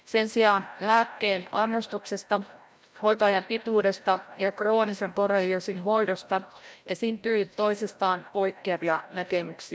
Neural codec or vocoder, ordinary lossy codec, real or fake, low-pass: codec, 16 kHz, 0.5 kbps, FreqCodec, larger model; none; fake; none